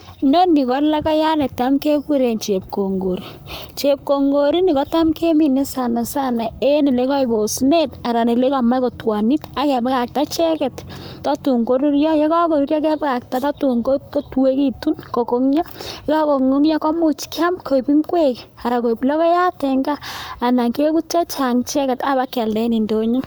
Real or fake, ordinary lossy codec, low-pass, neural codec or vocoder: fake; none; none; codec, 44.1 kHz, 7.8 kbps, Pupu-Codec